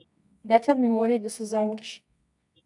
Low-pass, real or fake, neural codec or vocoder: 10.8 kHz; fake; codec, 24 kHz, 0.9 kbps, WavTokenizer, medium music audio release